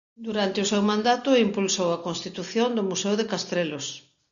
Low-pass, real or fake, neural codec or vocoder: 7.2 kHz; real; none